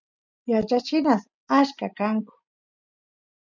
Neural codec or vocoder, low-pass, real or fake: none; 7.2 kHz; real